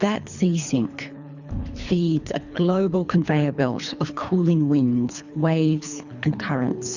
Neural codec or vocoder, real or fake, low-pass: codec, 24 kHz, 3 kbps, HILCodec; fake; 7.2 kHz